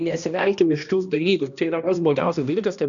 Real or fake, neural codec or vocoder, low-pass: fake; codec, 16 kHz, 1 kbps, X-Codec, HuBERT features, trained on balanced general audio; 7.2 kHz